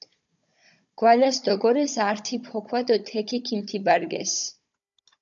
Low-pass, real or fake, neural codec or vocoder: 7.2 kHz; fake; codec, 16 kHz, 16 kbps, FunCodec, trained on Chinese and English, 50 frames a second